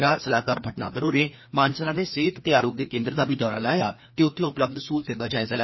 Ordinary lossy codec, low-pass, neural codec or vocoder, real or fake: MP3, 24 kbps; 7.2 kHz; codec, 16 kHz, 2 kbps, FreqCodec, larger model; fake